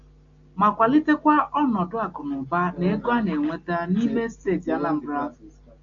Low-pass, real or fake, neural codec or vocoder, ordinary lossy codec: 7.2 kHz; real; none; AAC, 64 kbps